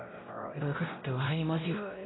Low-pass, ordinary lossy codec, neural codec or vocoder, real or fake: 7.2 kHz; AAC, 16 kbps; codec, 16 kHz, 0.5 kbps, X-Codec, WavLM features, trained on Multilingual LibriSpeech; fake